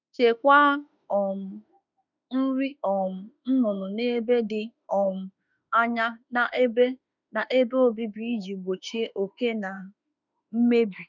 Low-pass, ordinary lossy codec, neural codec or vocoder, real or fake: 7.2 kHz; none; autoencoder, 48 kHz, 32 numbers a frame, DAC-VAE, trained on Japanese speech; fake